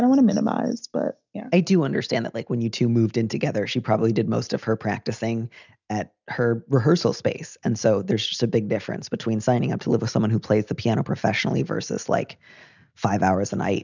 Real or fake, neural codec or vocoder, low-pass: real; none; 7.2 kHz